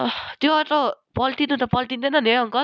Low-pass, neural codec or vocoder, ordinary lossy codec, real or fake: none; none; none; real